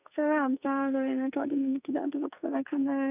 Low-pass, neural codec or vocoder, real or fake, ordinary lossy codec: 3.6 kHz; codec, 44.1 kHz, 2.6 kbps, SNAC; fake; none